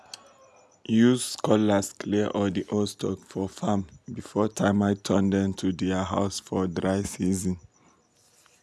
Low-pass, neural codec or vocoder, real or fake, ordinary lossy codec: none; none; real; none